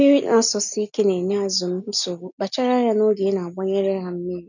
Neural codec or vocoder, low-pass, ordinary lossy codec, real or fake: none; 7.2 kHz; none; real